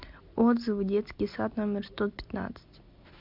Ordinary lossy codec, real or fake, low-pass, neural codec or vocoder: MP3, 48 kbps; real; 5.4 kHz; none